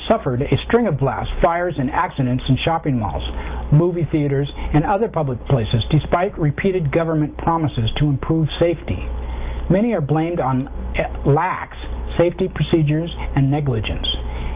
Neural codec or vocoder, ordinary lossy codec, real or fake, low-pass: none; Opus, 24 kbps; real; 3.6 kHz